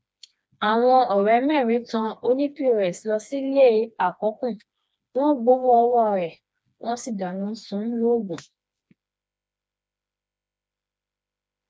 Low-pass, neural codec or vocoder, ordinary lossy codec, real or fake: none; codec, 16 kHz, 2 kbps, FreqCodec, smaller model; none; fake